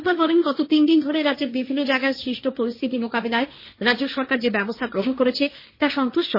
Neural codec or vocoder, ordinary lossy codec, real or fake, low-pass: codec, 16 kHz, 1.1 kbps, Voila-Tokenizer; MP3, 24 kbps; fake; 5.4 kHz